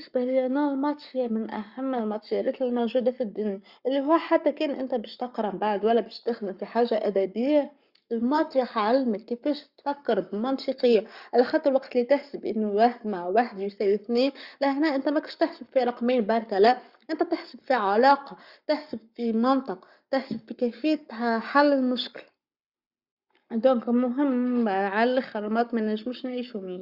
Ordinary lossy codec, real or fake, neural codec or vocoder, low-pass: Opus, 64 kbps; fake; codec, 44.1 kHz, 7.8 kbps, Pupu-Codec; 5.4 kHz